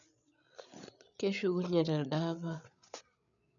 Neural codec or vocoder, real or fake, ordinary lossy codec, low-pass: codec, 16 kHz, 8 kbps, FreqCodec, larger model; fake; none; 7.2 kHz